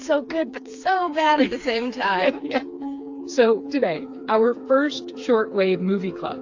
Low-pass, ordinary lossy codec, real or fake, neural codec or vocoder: 7.2 kHz; AAC, 48 kbps; fake; codec, 16 kHz, 4 kbps, FreqCodec, smaller model